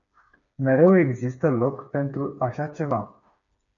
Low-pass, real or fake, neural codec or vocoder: 7.2 kHz; fake; codec, 16 kHz, 4 kbps, FreqCodec, smaller model